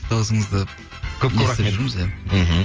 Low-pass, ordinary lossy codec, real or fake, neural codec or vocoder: 7.2 kHz; Opus, 24 kbps; real; none